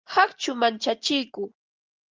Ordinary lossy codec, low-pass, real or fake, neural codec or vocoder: Opus, 24 kbps; 7.2 kHz; real; none